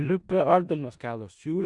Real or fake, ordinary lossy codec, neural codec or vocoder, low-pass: fake; Opus, 64 kbps; codec, 16 kHz in and 24 kHz out, 0.4 kbps, LongCat-Audio-Codec, four codebook decoder; 10.8 kHz